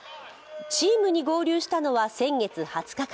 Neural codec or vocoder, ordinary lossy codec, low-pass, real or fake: none; none; none; real